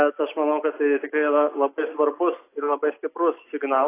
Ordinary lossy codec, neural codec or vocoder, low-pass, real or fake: AAC, 24 kbps; none; 3.6 kHz; real